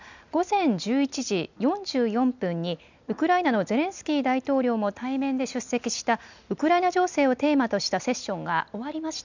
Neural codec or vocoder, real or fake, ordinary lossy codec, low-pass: none; real; none; 7.2 kHz